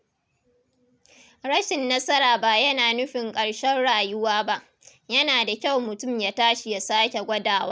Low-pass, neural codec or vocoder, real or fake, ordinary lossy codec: none; none; real; none